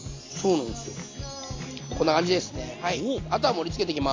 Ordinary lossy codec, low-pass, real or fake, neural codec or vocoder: AAC, 32 kbps; 7.2 kHz; real; none